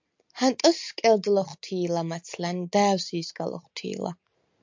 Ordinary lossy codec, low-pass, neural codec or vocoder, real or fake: MP3, 64 kbps; 7.2 kHz; none; real